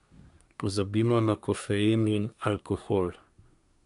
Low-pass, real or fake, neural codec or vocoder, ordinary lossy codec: 10.8 kHz; fake; codec, 24 kHz, 1 kbps, SNAC; none